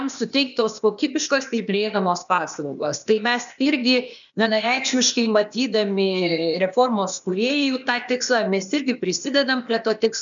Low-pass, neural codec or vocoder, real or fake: 7.2 kHz; codec, 16 kHz, 0.8 kbps, ZipCodec; fake